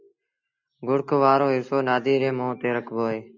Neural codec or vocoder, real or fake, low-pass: none; real; 7.2 kHz